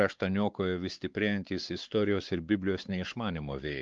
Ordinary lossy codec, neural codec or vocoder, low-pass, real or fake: Opus, 32 kbps; codec, 16 kHz, 4 kbps, X-Codec, WavLM features, trained on Multilingual LibriSpeech; 7.2 kHz; fake